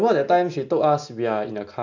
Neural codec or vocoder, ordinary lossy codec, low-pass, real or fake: none; none; 7.2 kHz; real